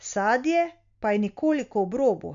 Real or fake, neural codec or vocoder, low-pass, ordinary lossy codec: real; none; 7.2 kHz; none